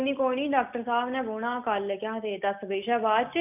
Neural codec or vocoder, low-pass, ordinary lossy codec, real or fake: none; 3.6 kHz; none; real